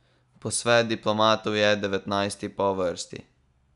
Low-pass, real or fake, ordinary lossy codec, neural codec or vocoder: 10.8 kHz; real; none; none